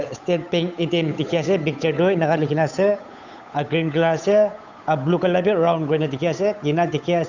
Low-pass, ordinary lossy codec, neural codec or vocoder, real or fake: 7.2 kHz; none; codec, 16 kHz, 8 kbps, FunCodec, trained on Chinese and English, 25 frames a second; fake